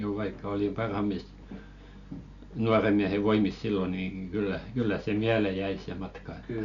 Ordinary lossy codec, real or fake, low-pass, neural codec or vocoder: none; real; 7.2 kHz; none